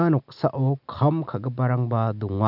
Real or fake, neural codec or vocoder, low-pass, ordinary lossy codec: real; none; 5.4 kHz; none